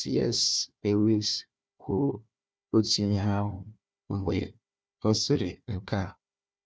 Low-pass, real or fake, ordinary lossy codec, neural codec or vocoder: none; fake; none; codec, 16 kHz, 1 kbps, FunCodec, trained on Chinese and English, 50 frames a second